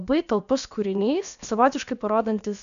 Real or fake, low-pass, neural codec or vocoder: fake; 7.2 kHz; codec, 16 kHz, about 1 kbps, DyCAST, with the encoder's durations